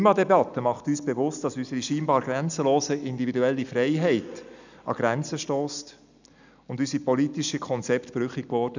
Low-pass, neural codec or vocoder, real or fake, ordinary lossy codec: 7.2 kHz; none; real; none